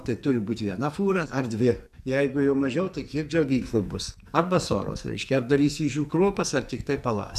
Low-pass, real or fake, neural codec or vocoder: 14.4 kHz; fake; codec, 44.1 kHz, 2.6 kbps, SNAC